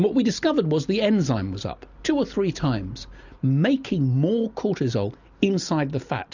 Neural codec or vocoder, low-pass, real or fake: none; 7.2 kHz; real